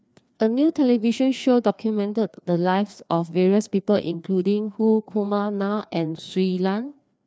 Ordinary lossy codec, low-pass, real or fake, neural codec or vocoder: none; none; fake; codec, 16 kHz, 2 kbps, FreqCodec, larger model